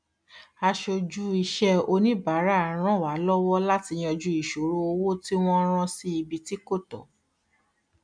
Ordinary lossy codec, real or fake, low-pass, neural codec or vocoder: MP3, 96 kbps; real; 9.9 kHz; none